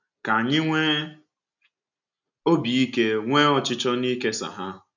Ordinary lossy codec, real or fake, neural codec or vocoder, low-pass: none; real; none; 7.2 kHz